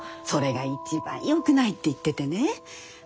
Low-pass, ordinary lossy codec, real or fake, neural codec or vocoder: none; none; real; none